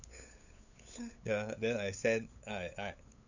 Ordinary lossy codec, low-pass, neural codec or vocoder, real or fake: none; 7.2 kHz; codec, 16 kHz, 8 kbps, FunCodec, trained on LibriTTS, 25 frames a second; fake